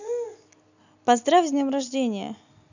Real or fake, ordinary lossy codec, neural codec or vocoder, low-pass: real; none; none; 7.2 kHz